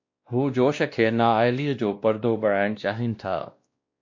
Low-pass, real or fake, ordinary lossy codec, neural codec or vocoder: 7.2 kHz; fake; MP3, 48 kbps; codec, 16 kHz, 1 kbps, X-Codec, WavLM features, trained on Multilingual LibriSpeech